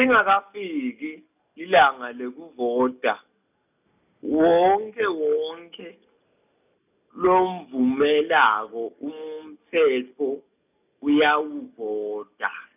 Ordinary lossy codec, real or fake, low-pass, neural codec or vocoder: none; real; 3.6 kHz; none